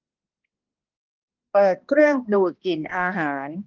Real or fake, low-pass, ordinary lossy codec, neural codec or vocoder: fake; 7.2 kHz; Opus, 32 kbps; codec, 16 kHz, 1 kbps, X-Codec, HuBERT features, trained on balanced general audio